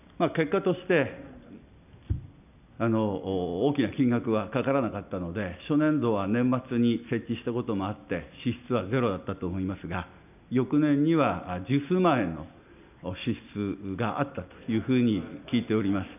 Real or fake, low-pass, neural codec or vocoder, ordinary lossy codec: real; 3.6 kHz; none; none